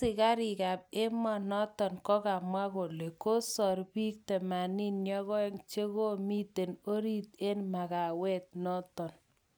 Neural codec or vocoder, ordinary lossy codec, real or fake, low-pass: none; none; real; none